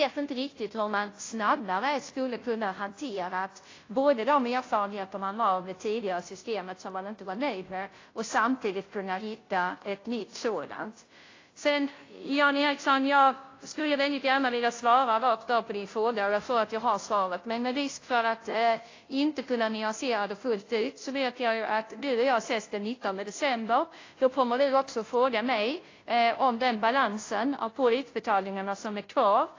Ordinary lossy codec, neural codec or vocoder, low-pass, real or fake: AAC, 32 kbps; codec, 16 kHz, 0.5 kbps, FunCodec, trained on Chinese and English, 25 frames a second; 7.2 kHz; fake